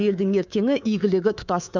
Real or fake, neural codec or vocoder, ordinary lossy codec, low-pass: fake; codec, 24 kHz, 3.1 kbps, DualCodec; none; 7.2 kHz